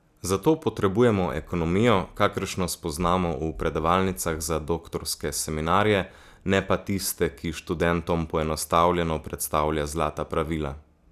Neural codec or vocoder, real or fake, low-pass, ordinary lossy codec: none; real; 14.4 kHz; none